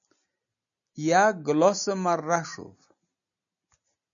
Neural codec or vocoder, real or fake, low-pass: none; real; 7.2 kHz